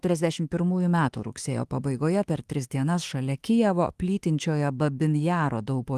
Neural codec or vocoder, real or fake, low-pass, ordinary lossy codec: autoencoder, 48 kHz, 32 numbers a frame, DAC-VAE, trained on Japanese speech; fake; 14.4 kHz; Opus, 24 kbps